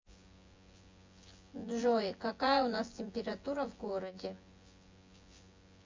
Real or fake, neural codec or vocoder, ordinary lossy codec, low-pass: fake; vocoder, 24 kHz, 100 mel bands, Vocos; MP3, 48 kbps; 7.2 kHz